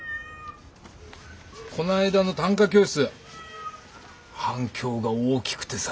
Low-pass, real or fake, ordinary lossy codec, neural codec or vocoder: none; real; none; none